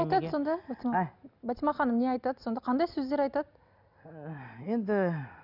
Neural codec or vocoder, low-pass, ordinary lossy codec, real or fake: none; 5.4 kHz; none; real